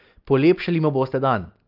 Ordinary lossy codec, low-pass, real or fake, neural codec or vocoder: Opus, 32 kbps; 5.4 kHz; real; none